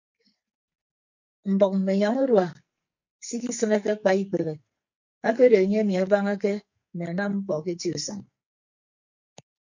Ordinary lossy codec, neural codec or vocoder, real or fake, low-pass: MP3, 48 kbps; codec, 44.1 kHz, 2.6 kbps, SNAC; fake; 7.2 kHz